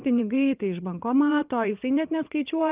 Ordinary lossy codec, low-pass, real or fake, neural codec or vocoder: Opus, 32 kbps; 3.6 kHz; fake; vocoder, 22.05 kHz, 80 mel bands, WaveNeXt